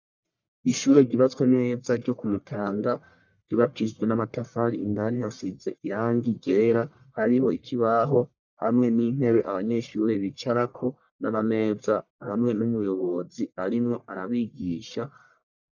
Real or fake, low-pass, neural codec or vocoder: fake; 7.2 kHz; codec, 44.1 kHz, 1.7 kbps, Pupu-Codec